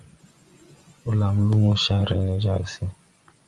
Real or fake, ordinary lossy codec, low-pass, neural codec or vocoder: fake; Opus, 32 kbps; 10.8 kHz; vocoder, 24 kHz, 100 mel bands, Vocos